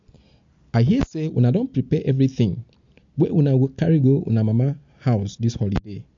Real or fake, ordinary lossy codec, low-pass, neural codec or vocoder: real; MP3, 48 kbps; 7.2 kHz; none